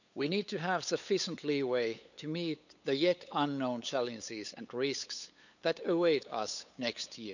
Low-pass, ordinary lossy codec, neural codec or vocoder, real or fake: 7.2 kHz; none; codec, 16 kHz, 8 kbps, FunCodec, trained on LibriTTS, 25 frames a second; fake